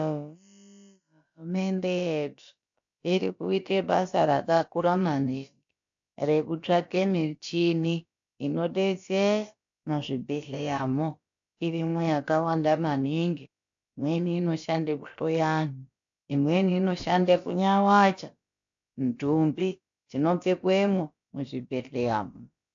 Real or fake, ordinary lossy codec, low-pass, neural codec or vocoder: fake; AAC, 64 kbps; 7.2 kHz; codec, 16 kHz, about 1 kbps, DyCAST, with the encoder's durations